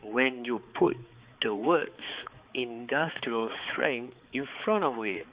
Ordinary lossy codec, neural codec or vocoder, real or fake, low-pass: Opus, 64 kbps; codec, 16 kHz, 4 kbps, X-Codec, HuBERT features, trained on balanced general audio; fake; 3.6 kHz